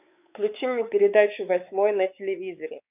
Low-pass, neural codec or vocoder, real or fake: 3.6 kHz; codec, 16 kHz, 4 kbps, X-Codec, WavLM features, trained on Multilingual LibriSpeech; fake